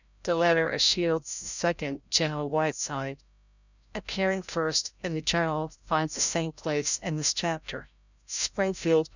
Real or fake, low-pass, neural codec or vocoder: fake; 7.2 kHz; codec, 16 kHz, 0.5 kbps, FreqCodec, larger model